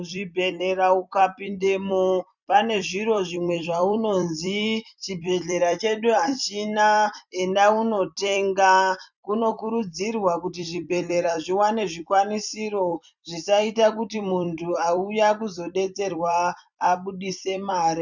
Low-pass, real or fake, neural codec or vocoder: 7.2 kHz; fake; vocoder, 44.1 kHz, 128 mel bands every 256 samples, BigVGAN v2